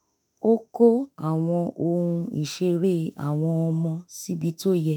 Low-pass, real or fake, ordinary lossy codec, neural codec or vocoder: none; fake; none; autoencoder, 48 kHz, 32 numbers a frame, DAC-VAE, trained on Japanese speech